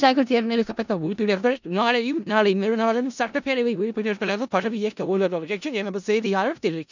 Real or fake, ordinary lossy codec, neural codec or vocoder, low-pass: fake; none; codec, 16 kHz in and 24 kHz out, 0.4 kbps, LongCat-Audio-Codec, four codebook decoder; 7.2 kHz